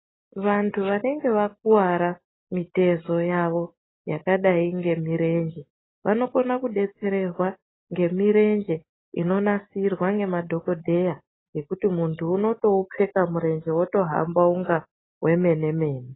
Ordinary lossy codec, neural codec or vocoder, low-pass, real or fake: AAC, 16 kbps; none; 7.2 kHz; real